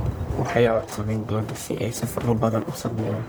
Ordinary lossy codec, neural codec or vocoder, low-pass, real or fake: none; codec, 44.1 kHz, 1.7 kbps, Pupu-Codec; none; fake